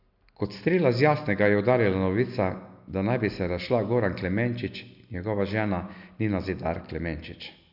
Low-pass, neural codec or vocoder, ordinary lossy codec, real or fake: 5.4 kHz; none; none; real